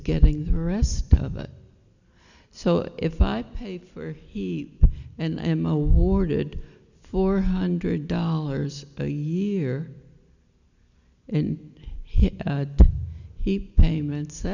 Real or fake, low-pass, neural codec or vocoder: real; 7.2 kHz; none